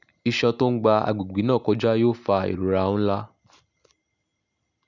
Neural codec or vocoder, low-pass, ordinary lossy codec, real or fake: none; 7.2 kHz; none; real